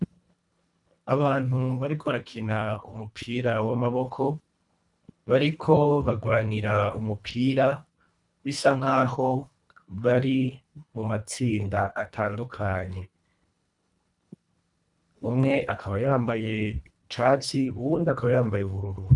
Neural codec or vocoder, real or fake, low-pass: codec, 24 kHz, 1.5 kbps, HILCodec; fake; 10.8 kHz